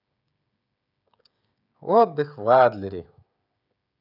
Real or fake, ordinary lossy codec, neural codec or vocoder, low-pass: fake; none; codec, 16 kHz, 16 kbps, FreqCodec, smaller model; 5.4 kHz